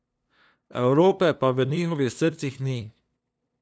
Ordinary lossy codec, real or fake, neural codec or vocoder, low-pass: none; fake; codec, 16 kHz, 2 kbps, FunCodec, trained on LibriTTS, 25 frames a second; none